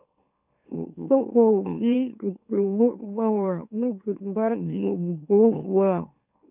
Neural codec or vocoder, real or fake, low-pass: autoencoder, 44.1 kHz, a latent of 192 numbers a frame, MeloTTS; fake; 3.6 kHz